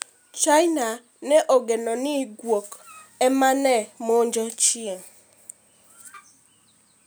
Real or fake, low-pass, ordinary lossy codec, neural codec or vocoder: real; none; none; none